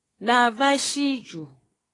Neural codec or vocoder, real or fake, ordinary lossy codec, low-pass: codec, 24 kHz, 1 kbps, SNAC; fake; AAC, 32 kbps; 10.8 kHz